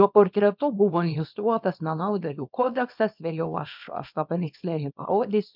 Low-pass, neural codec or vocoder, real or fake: 5.4 kHz; codec, 24 kHz, 0.9 kbps, WavTokenizer, small release; fake